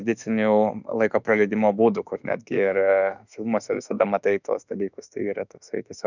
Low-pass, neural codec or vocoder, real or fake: 7.2 kHz; autoencoder, 48 kHz, 32 numbers a frame, DAC-VAE, trained on Japanese speech; fake